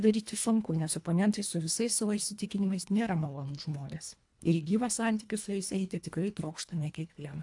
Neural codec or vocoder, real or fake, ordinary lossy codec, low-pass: codec, 24 kHz, 1.5 kbps, HILCodec; fake; AAC, 64 kbps; 10.8 kHz